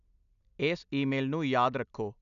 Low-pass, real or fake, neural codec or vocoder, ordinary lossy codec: 7.2 kHz; real; none; none